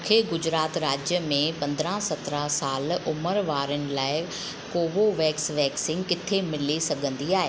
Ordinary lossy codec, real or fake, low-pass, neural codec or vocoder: none; real; none; none